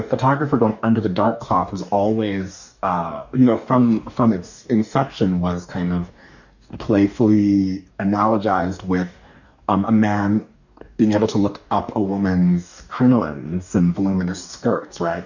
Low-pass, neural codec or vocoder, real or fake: 7.2 kHz; codec, 44.1 kHz, 2.6 kbps, DAC; fake